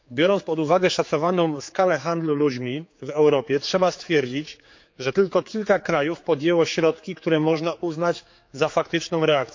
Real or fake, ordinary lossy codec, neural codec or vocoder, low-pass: fake; MP3, 48 kbps; codec, 16 kHz, 4 kbps, X-Codec, HuBERT features, trained on general audio; 7.2 kHz